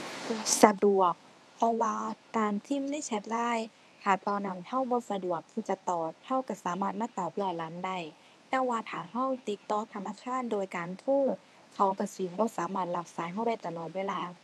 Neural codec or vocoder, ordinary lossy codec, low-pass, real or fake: codec, 24 kHz, 0.9 kbps, WavTokenizer, medium speech release version 1; none; none; fake